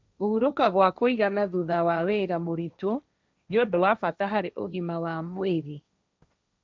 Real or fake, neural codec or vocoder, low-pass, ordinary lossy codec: fake; codec, 16 kHz, 1.1 kbps, Voila-Tokenizer; none; none